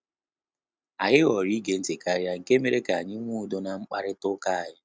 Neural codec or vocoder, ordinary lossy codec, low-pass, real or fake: none; none; none; real